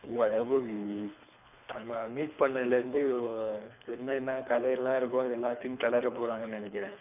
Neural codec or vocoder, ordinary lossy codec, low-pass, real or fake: codec, 24 kHz, 3 kbps, HILCodec; none; 3.6 kHz; fake